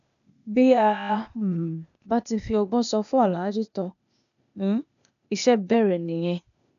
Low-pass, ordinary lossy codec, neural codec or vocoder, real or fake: 7.2 kHz; none; codec, 16 kHz, 0.8 kbps, ZipCodec; fake